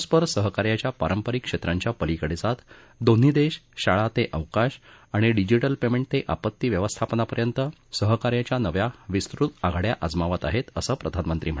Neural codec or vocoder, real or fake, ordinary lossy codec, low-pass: none; real; none; none